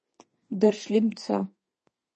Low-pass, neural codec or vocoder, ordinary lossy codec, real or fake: 10.8 kHz; codec, 32 kHz, 1.9 kbps, SNAC; MP3, 32 kbps; fake